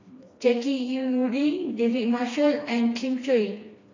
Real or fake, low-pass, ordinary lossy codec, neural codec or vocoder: fake; 7.2 kHz; AAC, 32 kbps; codec, 16 kHz, 2 kbps, FreqCodec, smaller model